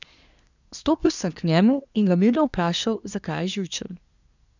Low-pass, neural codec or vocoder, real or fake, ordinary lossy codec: 7.2 kHz; codec, 24 kHz, 1 kbps, SNAC; fake; none